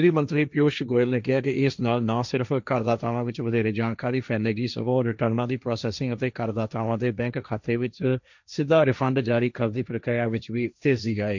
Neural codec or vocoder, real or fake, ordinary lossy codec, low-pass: codec, 16 kHz, 1.1 kbps, Voila-Tokenizer; fake; none; 7.2 kHz